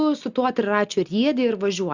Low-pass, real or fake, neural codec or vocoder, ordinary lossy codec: 7.2 kHz; real; none; Opus, 64 kbps